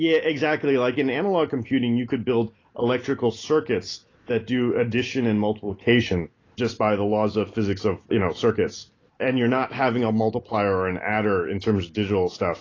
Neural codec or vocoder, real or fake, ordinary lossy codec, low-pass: none; real; AAC, 32 kbps; 7.2 kHz